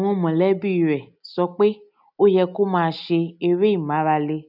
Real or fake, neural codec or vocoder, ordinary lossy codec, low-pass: real; none; none; 5.4 kHz